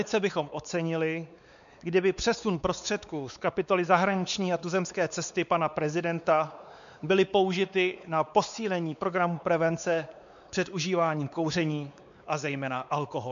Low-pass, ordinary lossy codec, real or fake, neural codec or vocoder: 7.2 kHz; MP3, 96 kbps; fake; codec, 16 kHz, 4 kbps, X-Codec, WavLM features, trained on Multilingual LibriSpeech